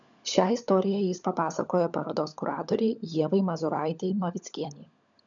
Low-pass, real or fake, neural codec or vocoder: 7.2 kHz; fake; codec, 16 kHz, 16 kbps, FunCodec, trained on LibriTTS, 50 frames a second